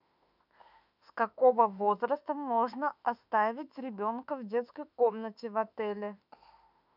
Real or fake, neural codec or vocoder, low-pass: fake; autoencoder, 48 kHz, 32 numbers a frame, DAC-VAE, trained on Japanese speech; 5.4 kHz